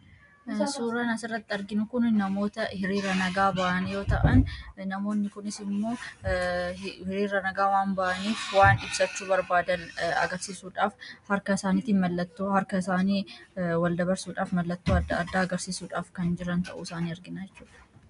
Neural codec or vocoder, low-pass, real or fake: none; 10.8 kHz; real